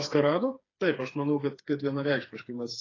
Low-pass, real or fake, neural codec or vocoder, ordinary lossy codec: 7.2 kHz; fake; codec, 16 kHz, 4 kbps, FreqCodec, smaller model; AAC, 32 kbps